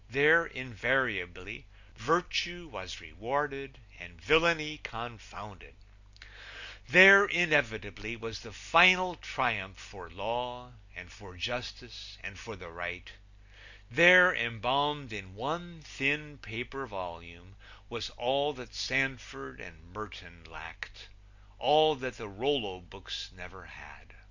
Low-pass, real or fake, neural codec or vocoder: 7.2 kHz; real; none